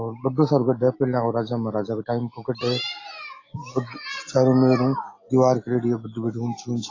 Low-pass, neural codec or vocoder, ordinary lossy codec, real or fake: 7.2 kHz; none; AAC, 32 kbps; real